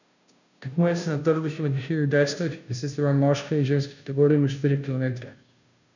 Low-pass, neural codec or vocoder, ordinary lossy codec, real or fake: 7.2 kHz; codec, 16 kHz, 0.5 kbps, FunCodec, trained on Chinese and English, 25 frames a second; none; fake